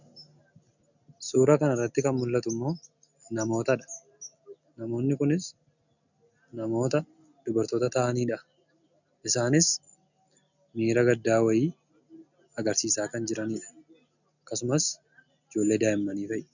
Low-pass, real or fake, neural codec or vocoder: 7.2 kHz; real; none